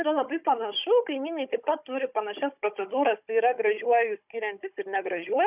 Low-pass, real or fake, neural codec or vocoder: 3.6 kHz; fake; codec, 16 kHz, 16 kbps, FunCodec, trained on Chinese and English, 50 frames a second